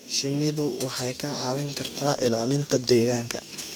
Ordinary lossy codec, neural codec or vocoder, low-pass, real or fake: none; codec, 44.1 kHz, 2.6 kbps, DAC; none; fake